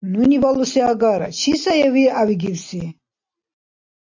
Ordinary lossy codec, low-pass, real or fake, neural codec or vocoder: AAC, 48 kbps; 7.2 kHz; real; none